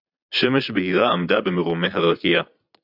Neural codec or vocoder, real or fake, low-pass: vocoder, 22.05 kHz, 80 mel bands, Vocos; fake; 5.4 kHz